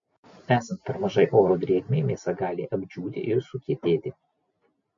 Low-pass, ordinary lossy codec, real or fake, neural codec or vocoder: 7.2 kHz; MP3, 48 kbps; real; none